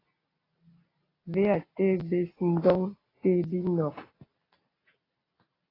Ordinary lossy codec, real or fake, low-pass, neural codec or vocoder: AAC, 24 kbps; real; 5.4 kHz; none